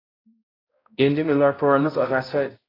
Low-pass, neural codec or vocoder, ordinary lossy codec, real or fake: 5.4 kHz; codec, 16 kHz, 0.5 kbps, X-Codec, HuBERT features, trained on balanced general audio; AAC, 24 kbps; fake